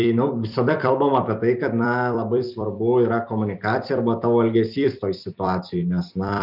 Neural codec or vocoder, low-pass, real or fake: vocoder, 44.1 kHz, 128 mel bands every 256 samples, BigVGAN v2; 5.4 kHz; fake